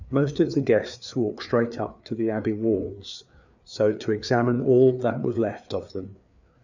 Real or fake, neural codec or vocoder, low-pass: fake; codec, 16 kHz, 4 kbps, FreqCodec, larger model; 7.2 kHz